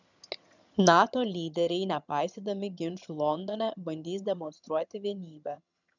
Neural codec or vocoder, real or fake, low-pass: vocoder, 22.05 kHz, 80 mel bands, HiFi-GAN; fake; 7.2 kHz